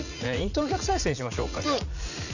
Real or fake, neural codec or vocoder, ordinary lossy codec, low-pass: fake; vocoder, 44.1 kHz, 80 mel bands, Vocos; none; 7.2 kHz